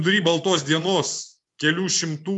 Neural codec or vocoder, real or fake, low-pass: vocoder, 24 kHz, 100 mel bands, Vocos; fake; 10.8 kHz